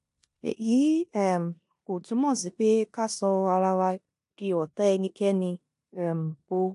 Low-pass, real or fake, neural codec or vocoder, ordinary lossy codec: 10.8 kHz; fake; codec, 16 kHz in and 24 kHz out, 0.9 kbps, LongCat-Audio-Codec, four codebook decoder; AAC, 64 kbps